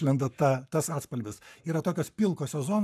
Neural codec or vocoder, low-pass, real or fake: codec, 44.1 kHz, 7.8 kbps, Pupu-Codec; 14.4 kHz; fake